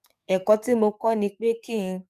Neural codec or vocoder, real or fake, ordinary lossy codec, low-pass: codec, 44.1 kHz, 7.8 kbps, DAC; fake; none; 14.4 kHz